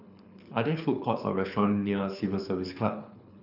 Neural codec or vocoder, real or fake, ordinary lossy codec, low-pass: codec, 24 kHz, 6 kbps, HILCodec; fake; none; 5.4 kHz